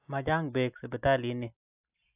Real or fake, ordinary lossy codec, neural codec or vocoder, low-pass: real; AAC, 32 kbps; none; 3.6 kHz